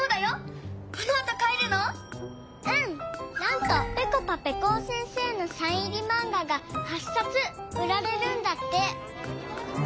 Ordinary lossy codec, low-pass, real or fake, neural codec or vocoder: none; none; real; none